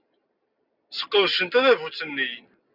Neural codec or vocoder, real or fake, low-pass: none; real; 5.4 kHz